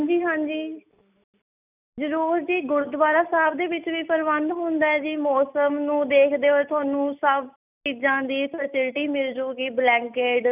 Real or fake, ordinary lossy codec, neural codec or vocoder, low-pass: real; none; none; 3.6 kHz